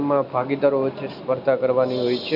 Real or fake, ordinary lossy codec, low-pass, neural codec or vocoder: real; none; 5.4 kHz; none